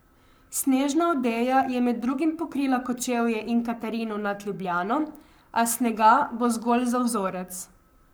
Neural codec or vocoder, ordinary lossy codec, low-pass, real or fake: codec, 44.1 kHz, 7.8 kbps, Pupu-Codec; none; none; fake